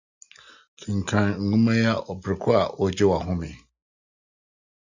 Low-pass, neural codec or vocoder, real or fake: 7.2 kHz; none; real